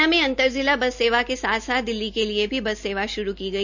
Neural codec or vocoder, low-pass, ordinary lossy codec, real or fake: none; 7.2 kHz; none; real